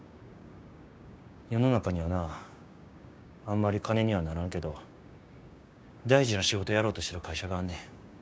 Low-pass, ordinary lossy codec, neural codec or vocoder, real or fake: none; none; codec, 16 kHz, 6 kbps, DAC; fake